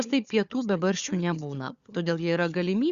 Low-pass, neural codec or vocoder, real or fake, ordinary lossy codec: 7.2 kHz; codec, 16 kHz, 4 kbps, FunCodec, trained on Chinese and English, 50 frames a second; fake; Opus, 64 kbps